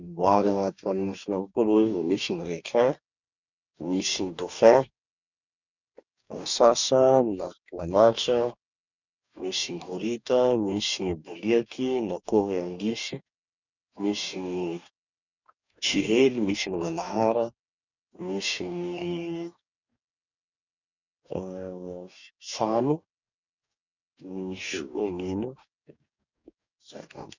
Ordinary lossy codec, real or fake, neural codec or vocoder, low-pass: none; fake; codec, 44.1 kHz, 2.6 kbps, DAC; 7.2 kHz